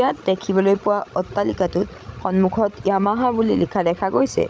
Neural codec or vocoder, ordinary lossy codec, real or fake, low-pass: codec, 16 kHz, 16 kbps, FreqCodec, larger model; none; fake; none